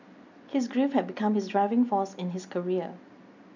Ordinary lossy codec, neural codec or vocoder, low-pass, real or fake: none; none; 7.2 kHz; real